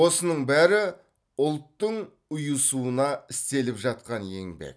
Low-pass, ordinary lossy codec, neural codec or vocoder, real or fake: none; none; none; real